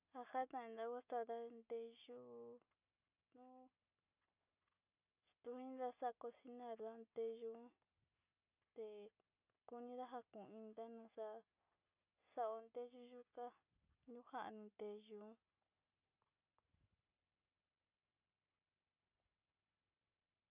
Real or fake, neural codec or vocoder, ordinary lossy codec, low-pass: real; none; none; 3.6 kHz